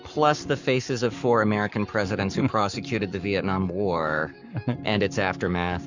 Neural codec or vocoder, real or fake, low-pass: codec, 44.1 kHz, 7.8 kbps, Pupu-Codec; fake; 7.2 kHz